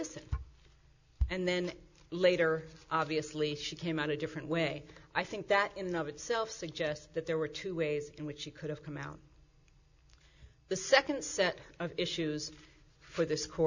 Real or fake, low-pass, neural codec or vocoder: real; 7.2 kHz; none